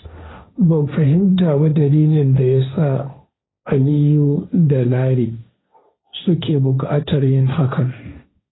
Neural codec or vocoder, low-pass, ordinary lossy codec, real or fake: codec, 16 kHz, 1.1 kbps, Voila-Tokenizer; 7.2 kHz; AAC, 16 kbps; fake